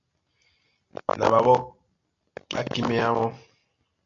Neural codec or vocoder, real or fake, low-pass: none; real; 7.2 kHz